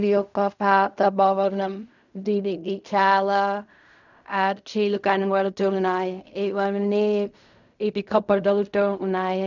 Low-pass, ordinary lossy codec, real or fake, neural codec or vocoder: 7.2 kHz; none; fake; codec, 16 kHz in and 24 kHz out, 0.4 kbps, LongCat-Audio-Codec, fine tuned four codebook decoder